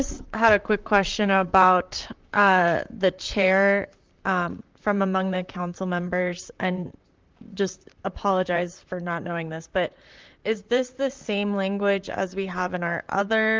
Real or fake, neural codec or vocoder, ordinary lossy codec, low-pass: fake; vocoder, 44.1 kHz, 128 mel bands, Pupu-Vocoder; Opus, 32 kbps; 7.2 kHz